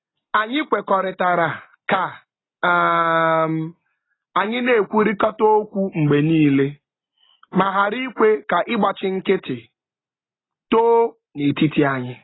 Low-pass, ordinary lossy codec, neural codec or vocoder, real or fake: 7.2 kHz; AAC, 16 kbps; none; real